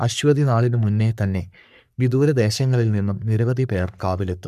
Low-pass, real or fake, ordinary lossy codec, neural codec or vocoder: 14.4 kHz; fake; none; codec, 44.1 kHz, 3.4 kbps, Pupu-Codec